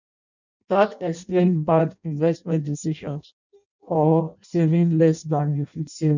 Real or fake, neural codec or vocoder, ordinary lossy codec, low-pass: fake; codec, 16 kHz in and 24 kHz out, 0.6 kbps, FireRedTTS-2 codec; none; 7.2 kHz